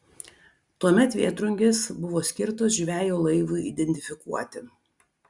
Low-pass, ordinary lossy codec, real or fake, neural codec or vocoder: 10.8 kHz; Opus, 64 kbps; real; none